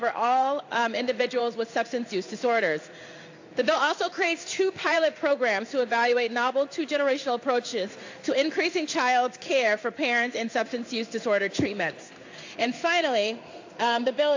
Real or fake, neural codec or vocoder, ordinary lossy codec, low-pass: fake; codec, 16 kHz in and 24 kHz out, 1 kbps, XY-Tokenizer; AAC, 48 kbps; 7.2 kHz